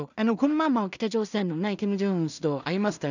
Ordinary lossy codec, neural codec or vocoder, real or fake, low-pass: none; codec, 16 kHz in and 24 kHz out, 0.4 kbps, LongCat-Audio-Codec, two codebook decoder; fake; 7.2 kHz